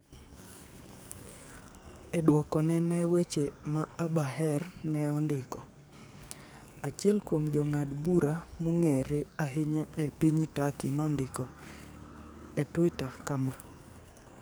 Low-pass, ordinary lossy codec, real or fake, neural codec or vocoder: none; none; fake; codec, 44.1 kHz, 2.6 kbps, SNAC